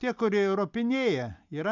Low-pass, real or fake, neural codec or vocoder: 7.2 kHz; real; none